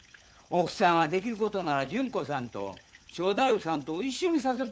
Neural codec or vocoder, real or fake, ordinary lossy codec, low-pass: codec, 16 kHz, 4 kbps, FunCodec, trained on LibriTTS, 50 frames a second; fake; none; none